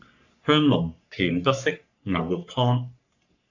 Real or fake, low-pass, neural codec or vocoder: fake; 7.2 kHz; codec, 44.1 kHz, 3.4 kbps, Pupu-Codec